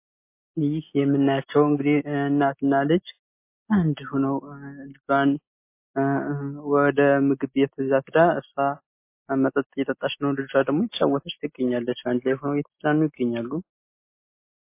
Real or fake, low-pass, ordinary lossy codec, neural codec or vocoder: real; 3.6 kHz; MP3, 24 kbps; none